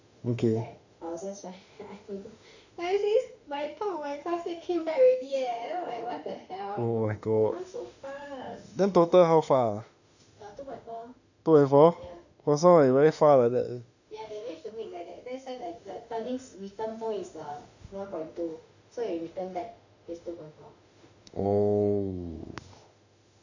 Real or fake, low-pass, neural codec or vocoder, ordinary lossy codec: fake; 7.2 kHz; autoencoder, 48 kHz, 32 numbers a frame, DAC-VAE, trained on Japanese speech; none